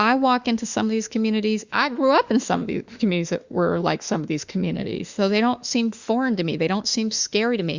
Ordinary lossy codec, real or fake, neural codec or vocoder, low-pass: Opus, 64 kbps; fake; autoencoder, 48 kHz, 32 numbers a frame, DAC-VAE, trained on Japanese speech; 7.2 kHz